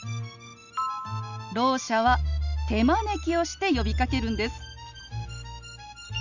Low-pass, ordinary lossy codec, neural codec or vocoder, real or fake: 7.2 kHz; none; none; real